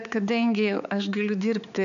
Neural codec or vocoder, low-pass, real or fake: codec, 16 kHz, 4 kbps, X-Codec, HuBERT features, trained on balanced general audio; 7.2 kHz; fake